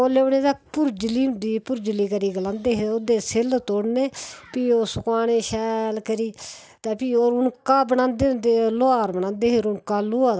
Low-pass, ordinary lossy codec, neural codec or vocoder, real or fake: none; none; none; real